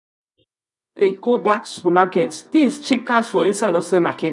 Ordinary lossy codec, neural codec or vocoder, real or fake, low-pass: none; codec, 24 kHz, 0.9 kbps, WavTokenizer, medium music audio release; fake; 10.8 kHz